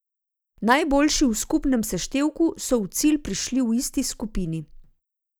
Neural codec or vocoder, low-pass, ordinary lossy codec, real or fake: none; none; none; real